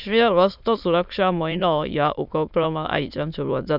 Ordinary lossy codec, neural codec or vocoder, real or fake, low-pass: none; autoencoder, 22.05 kHz, a latent of 192 numbers a frame, VITS, trained on many speakers; fake; 5.4 kHz